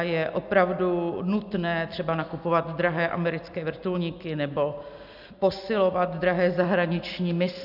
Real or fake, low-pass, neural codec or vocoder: real; 5.4 kHz; none